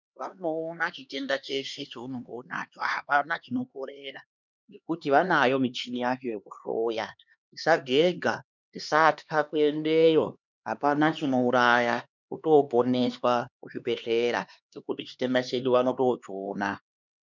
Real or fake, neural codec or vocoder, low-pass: fake; codec, 16 kHz, 2 kbps, X-Codec, HuBERT features, trained on LibriSpeech; 7.2 kHz